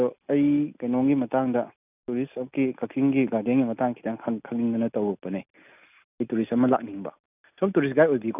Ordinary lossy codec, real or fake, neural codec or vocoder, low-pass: none; real; none; 3.6 kHz